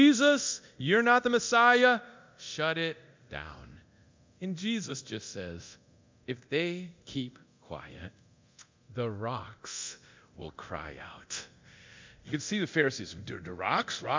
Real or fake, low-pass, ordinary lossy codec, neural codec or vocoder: fake; 7.2 kHz; MP3, 64 kbps; codec, 24 kHz, 0.9 kbps, DualCodec